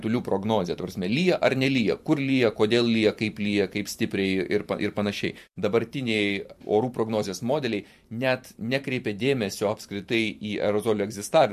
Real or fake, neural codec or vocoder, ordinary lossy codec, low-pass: fake; vocoder, 44.1 kHz, 128 mel bands every 512 samples, BigVGAN v2; MP3, 64 kbps; 14.4 kHz